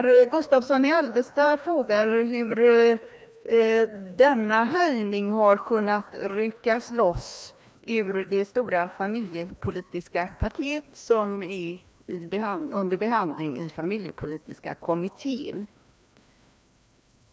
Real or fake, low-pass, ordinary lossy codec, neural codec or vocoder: fake; none; none; codec, 16 kHz, 1 kbps, FreqCodec, larger model